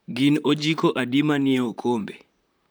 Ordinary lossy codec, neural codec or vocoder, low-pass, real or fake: none; vocoder, 44.1 kHz, 128 mel bands, Pupu-Vocoder; none; fake